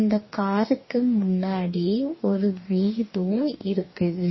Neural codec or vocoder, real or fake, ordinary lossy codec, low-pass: codec, 44.1 kHz, 2.6 kbps, DAC; fake; MP3, 24 kbps; 7.2 kHz